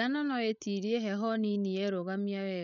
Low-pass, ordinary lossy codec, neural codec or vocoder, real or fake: 7.2 kHz; none; codec, 16 kHz, 16 kbps, FreqCodec, larger model; fake